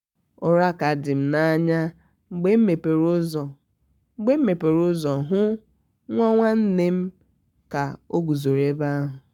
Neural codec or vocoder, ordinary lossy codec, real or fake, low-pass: codec, 44.1 kHz, 7.8 kbps, Pupu-Codec; none; fake; 19.8 kHz